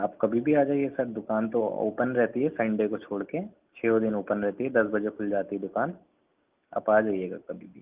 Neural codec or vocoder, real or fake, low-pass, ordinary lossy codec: none; real; 3.6 kHz; Opus, 24 kbps